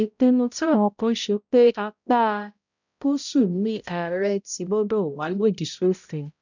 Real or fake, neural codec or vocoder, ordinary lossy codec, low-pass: fake; codec, 16 kHz, 0.5 kbps, X-Codec, HuBERT features, trained on balanced general audio; none; 7.2 kHz